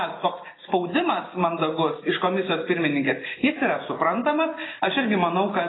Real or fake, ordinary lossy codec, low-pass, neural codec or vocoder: real; AAC, 16 kbps; 7.2 kHz; none